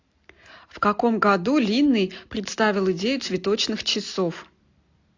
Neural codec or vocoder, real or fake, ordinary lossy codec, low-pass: none; real; AAC, 48 kbps; 7.2 kHz